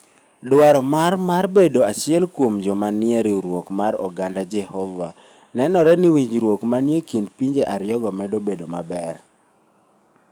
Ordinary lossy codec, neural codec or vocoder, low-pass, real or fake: none; codec, 44.1 kHz, 7.8 kbps, DAC; none; fake